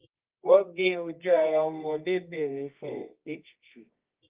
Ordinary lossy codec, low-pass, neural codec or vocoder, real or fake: Opus, 24 kbps; 3.6 kHz; codec, 24 kHz, 0.9 kbps, WavTokenizer, medium music audio release; fake